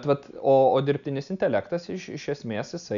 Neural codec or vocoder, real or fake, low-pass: none; real; 7.2 kHz